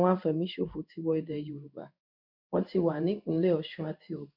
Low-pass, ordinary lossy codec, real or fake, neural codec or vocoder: 5.4 kHz; none; fake; codec, 16 kHz in and 24 kHz out, 1 kbps, XY-Tokenizer